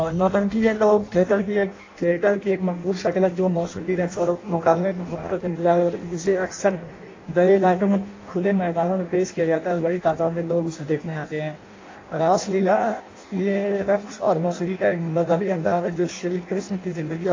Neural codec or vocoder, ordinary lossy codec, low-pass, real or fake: codec, 16 kHz in and 24 kHz out, 0.6 kbps, FireRedTTS-2 codec; AAC, 32 kbps; 7.2 kHz; fake